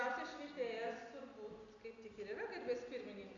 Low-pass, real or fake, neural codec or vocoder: 7.2 kHz; real; none